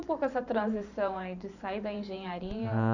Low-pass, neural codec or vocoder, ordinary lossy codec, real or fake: 7.2 kHz; vocoder, 44.1 kHz, 128 mel bands every 512 samples, BigVGAN v2; none; fake